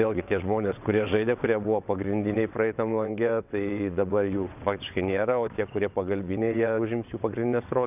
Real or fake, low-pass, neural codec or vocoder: fake; 3.6 kHz; vocoder, 22.05 kHz, 80 mel bands, WaveNeXt